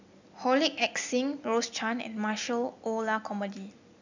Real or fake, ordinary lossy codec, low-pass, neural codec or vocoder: real; none; 7.2 kHz; none